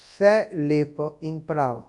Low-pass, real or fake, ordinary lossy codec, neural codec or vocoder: 10.8 kHz; fake; AAC, 64 kbps; codec, 24 kHz, 0.9 kbps, WavTokenizer, large speech release